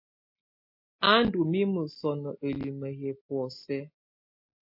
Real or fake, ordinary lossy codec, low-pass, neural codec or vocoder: real; MP3, 32 kbps; 5.4 kHz; none